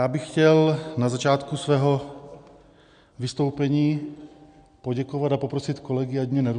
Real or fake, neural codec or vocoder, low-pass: real; none; 9.9 kHz